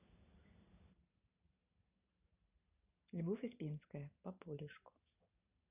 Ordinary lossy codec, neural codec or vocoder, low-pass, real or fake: none; none; 3.6 kHz; real